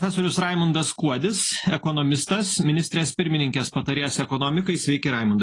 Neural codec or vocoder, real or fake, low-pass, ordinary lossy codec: none; real; 10.8 kHz; AAC, 32 kbps